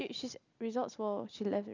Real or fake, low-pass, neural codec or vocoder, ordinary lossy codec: real; 7.2 kHz; none; none